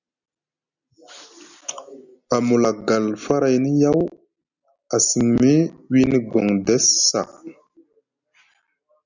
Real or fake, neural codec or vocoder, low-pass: real; none; 7.2 kHz